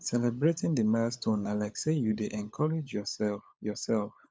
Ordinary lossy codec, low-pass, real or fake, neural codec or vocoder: none; none; fake; codec, 16 kHz, 16 kbps, FunCodec, trained on LibriTTS, 50 frames a second